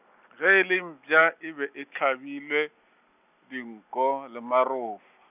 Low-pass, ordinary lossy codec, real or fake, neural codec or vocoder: 3.6 kHz; none; real; none